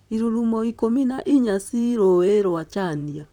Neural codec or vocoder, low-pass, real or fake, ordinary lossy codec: vocoder, 44.1 kHz, 128 mel bands, Pupu-Vocoder; 19.8 kHz; fake; none